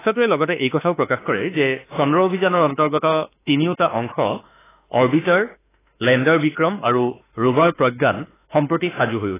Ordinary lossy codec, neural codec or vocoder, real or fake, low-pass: AAC, 16 kbps; autoencoder, 48 kHz, 32 numbers a frame, DAC-VAE, trained on Japanese speech; fake; 3.6 kHz